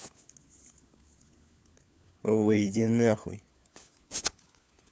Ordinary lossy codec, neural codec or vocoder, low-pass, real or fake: none; codec, 16 kHz, 4 kbps, FunCodec, trained on LibriTTS, 50 frames a second; none; fake